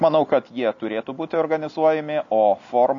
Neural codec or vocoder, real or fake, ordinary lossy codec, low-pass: none; real; MP3, 96 kbps; 7.2 kHz